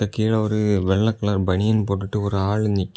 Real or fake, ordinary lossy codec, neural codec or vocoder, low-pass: real; none; none; none